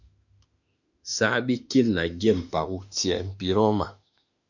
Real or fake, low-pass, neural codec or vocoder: fake; 7.2 kHz; autoencoder, 48 kHz, 32 numbers a frame, DAC-VAE, trained on Japanese speech